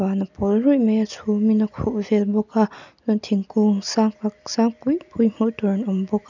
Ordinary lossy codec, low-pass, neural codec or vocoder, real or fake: none; 7.2 kHz; none; real